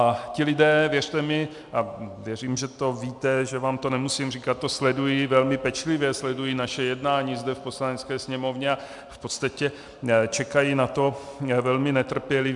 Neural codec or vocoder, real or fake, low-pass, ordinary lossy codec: none; real; 10.8 kHz; MP3, 96 kbps